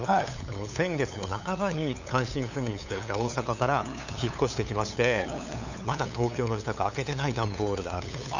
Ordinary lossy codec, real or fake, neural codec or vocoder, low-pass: none; fake; codec, 16 kHz, 8 kbps, FunCodec, trained on LibriTTS, 25 frames a second; 7.2 kHz